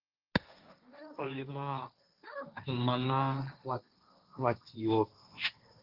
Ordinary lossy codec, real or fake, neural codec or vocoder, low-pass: Opus, 24 kbps; fake; codec, 16 kHz, 1.1 kbps, Voila-Tokenizer; 5.4 kHz